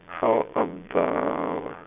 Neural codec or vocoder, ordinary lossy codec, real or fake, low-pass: vocoder, 22.05 kHz, 80 mel bands, Vocos; none; fake; 3.6 kHz